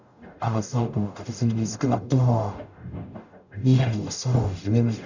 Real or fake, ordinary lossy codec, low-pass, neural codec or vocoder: fake; none; 7.2 kHz; codec, 44.1 kHz, 0.9 kbps, DAC